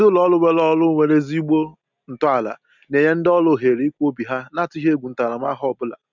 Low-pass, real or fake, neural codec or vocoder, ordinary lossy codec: 7.2 kHz; real; none; none